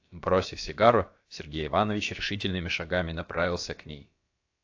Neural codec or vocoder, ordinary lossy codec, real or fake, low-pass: codec, 16 kHz, about 1 kbps, DyCAST, with the encoder's durations; AAC, 48 kbps; fake; 7.2 kHz